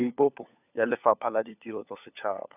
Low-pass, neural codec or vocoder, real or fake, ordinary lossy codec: 3.6 kHz; codec, 16 kHz, 4 kbps, FunCodec, trained on LibriTTS, 50 frames a second; fake; none